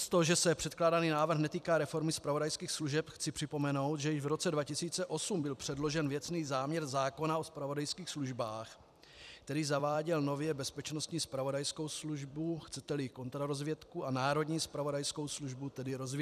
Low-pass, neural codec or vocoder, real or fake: 14.4 kHz; vocoder, 44.1 kHz, 128 mel bands every 256 samples, BigVGAN v2; fake